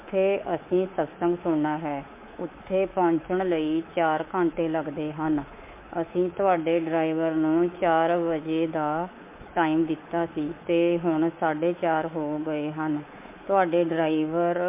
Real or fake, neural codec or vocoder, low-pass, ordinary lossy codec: fake; codec, 24 kHz, 3.1 kbps, DualCodec; 3.6 kHz; MP3, 24 kbps